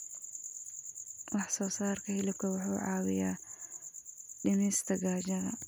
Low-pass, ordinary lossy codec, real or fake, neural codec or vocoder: none; none; real; none